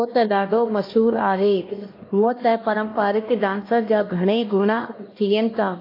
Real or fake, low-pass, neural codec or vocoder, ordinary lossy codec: fake; 5.4 kHz; codec, 16 kHz, 1 kbps, X-Codec, HuBERT features, trained on LibriSpeech; AAC, 32 kbps